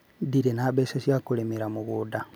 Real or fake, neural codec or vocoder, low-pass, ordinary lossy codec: real; none; none; none